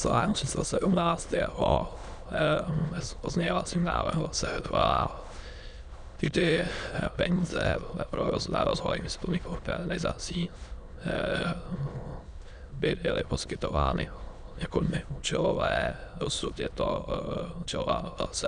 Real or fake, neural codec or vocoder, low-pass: fake; autoencoder, 22.05 kHz, a latent of 192 numbers a frame, VITS, trained on many speakers; 9.9 kHz